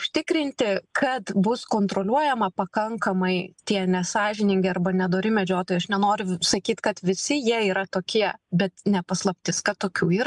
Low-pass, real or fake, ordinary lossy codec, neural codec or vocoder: 10.8 kHz; real; MP3, 96 kbps; none